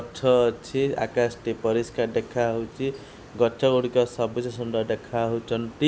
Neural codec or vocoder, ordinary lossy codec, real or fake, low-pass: none; none; real; none